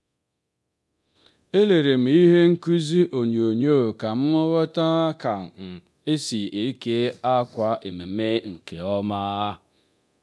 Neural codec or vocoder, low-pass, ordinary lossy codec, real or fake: codec, 24 kHz, 0.9 kbps, DualCodec; none; none; fake